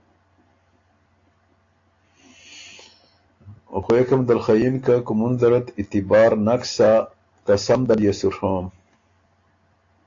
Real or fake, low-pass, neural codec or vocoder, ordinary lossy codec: real; 7.2 kHz; none; AAC, 48 kbps